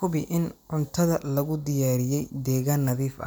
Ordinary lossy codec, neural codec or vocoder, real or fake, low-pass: none; none; real; none